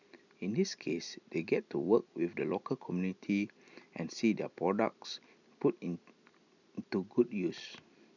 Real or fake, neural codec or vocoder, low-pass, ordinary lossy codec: real; none; 7.2 kHz; none